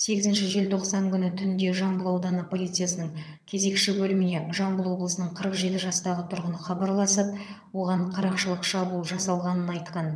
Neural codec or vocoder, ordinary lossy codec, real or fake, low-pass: vocoder, 22.05 kHz, 80 mel bands, HiFi-GAN; none; fake; none